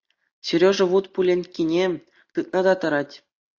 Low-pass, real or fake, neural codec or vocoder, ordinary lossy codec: 7.2 kHz; real; none; AAC, 48 kbps